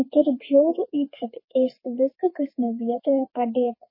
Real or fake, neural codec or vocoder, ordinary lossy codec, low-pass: fake; codec, 32 kHz, 1.9 kbps, SNAC; MP3, 24 kbps; 5.4 kHz